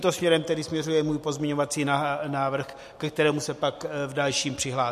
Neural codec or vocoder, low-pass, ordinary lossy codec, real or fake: none; 14.4 kHz; MP3, 64 kbps; real